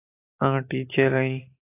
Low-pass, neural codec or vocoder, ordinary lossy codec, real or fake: 3.6 kHz; none; AAC, 16 kbps; real